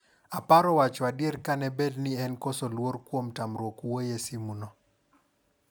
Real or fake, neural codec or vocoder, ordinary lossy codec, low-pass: real; none; none; none